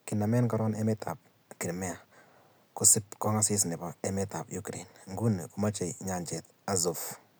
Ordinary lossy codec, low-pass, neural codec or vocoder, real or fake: none; none; none; real